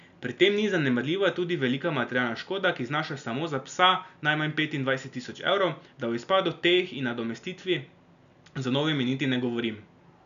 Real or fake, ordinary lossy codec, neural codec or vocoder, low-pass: real; none; none; 7.2 kHz